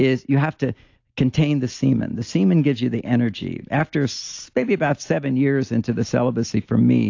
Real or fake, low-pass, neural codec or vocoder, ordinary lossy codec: real; 7.2 kHz; none; AAC, 48 kbps